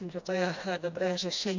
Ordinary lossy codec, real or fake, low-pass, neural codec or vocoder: MP3, 64 kbps; fake; 7.2 kHz; codec, 16 kHz, 1 kbps, FreqCodec, smaller model